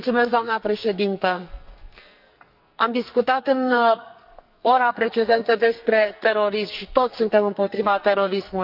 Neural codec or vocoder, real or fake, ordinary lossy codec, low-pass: codec, 44.1 kHz, 2.6 kbps, SNAC; fake; none; 5.4 kHz